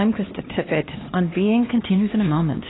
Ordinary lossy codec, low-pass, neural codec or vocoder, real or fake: AAC, 16 kbps; 7.2 kHz; codec, 16 kHz, 2 kbps, X-Codec, HuBERT features, trained on LibriSpeech; fake